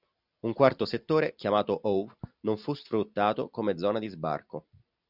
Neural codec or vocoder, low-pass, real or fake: none; 5.4 kHz; real